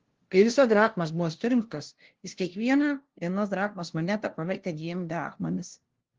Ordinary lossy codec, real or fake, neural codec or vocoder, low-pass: Opus, 16 kbps; fake; codec, 16 kHz, 0.5 kbps, FunCodec, trained on LibriTTS, 25 frames a second; 7.2 kHz